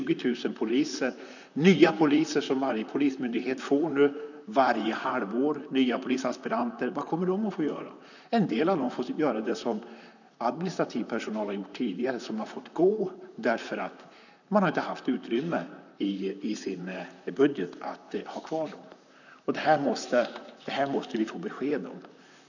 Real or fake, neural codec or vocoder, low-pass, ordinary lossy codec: fake; vocoder, 44.1 kHz, 128 mel bands, Pupu-Vocoder; 7.2 kHz; none